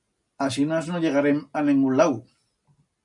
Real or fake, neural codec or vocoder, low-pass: real; none; 10.8 kHz